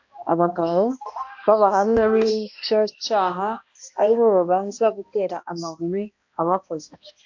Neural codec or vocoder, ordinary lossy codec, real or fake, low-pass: codec, 16 kHz, 1 kbps, X-Codec, HuBERT features, trained on balanced general audio; AAC, 48 kbps; fake; 7.2 kHz